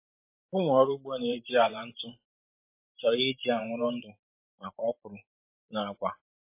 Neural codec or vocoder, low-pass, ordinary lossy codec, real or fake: vocoder, 44.1 kHz, 128 mel bands every 512 samples, BigVGAN v2; 3.6 kHz; MP3, 24 kbps; fake